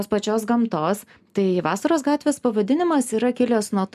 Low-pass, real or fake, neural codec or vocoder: 14.4 kHz; real; none